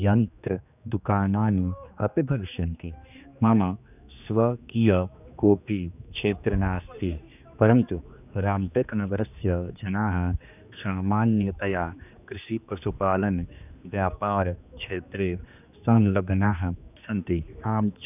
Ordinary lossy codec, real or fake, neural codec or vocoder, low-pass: none; fake; codec, 16 kHz, 2 kbps, X-Codec, HuBERT features, trained on general audio; 3.6 kHz